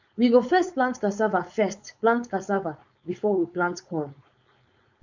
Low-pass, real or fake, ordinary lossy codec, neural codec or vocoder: 7.2 kHz; fake; none; codec, 16 kHz, 4.8 kbps, FACodec